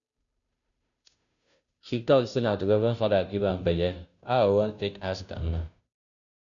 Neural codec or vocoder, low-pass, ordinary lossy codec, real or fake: codec, 16 kHz, 0.5 kbps, FunCodec, trained on Chinese and English, 25 frames a second; 7.2 kHz; none; fake